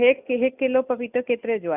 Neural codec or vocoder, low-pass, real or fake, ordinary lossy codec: none; 3.6 kHz; real; none